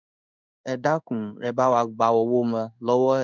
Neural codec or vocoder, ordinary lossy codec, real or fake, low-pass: codec, 16 kHz in and 24 kHz out, 1 kbps, XY-Tokenizer; none; fake; 7.2 kHz